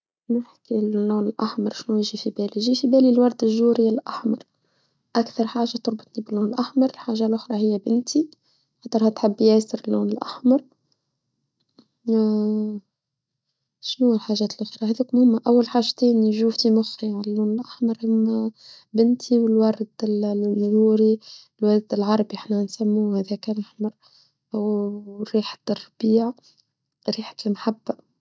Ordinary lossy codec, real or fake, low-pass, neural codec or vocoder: none; real; none; none